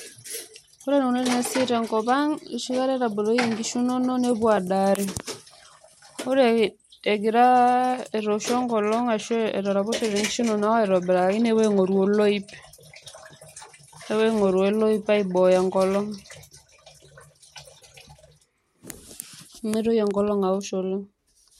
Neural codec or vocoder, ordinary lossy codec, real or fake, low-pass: none; MP3, 64 kbps; real; 19.8 kHz